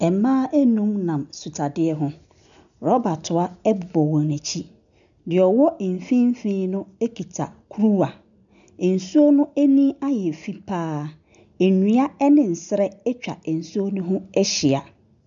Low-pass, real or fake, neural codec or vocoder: 7.2 kHz; real; none